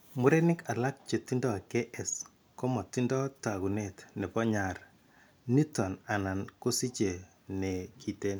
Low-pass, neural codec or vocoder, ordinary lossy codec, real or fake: none; none; none; real